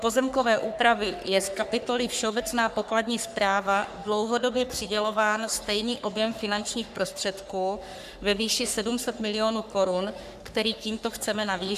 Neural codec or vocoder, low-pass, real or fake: codec, 44.1 kHz, 3.4 kbps, Pupu-Codec; 14.4 kHz; fake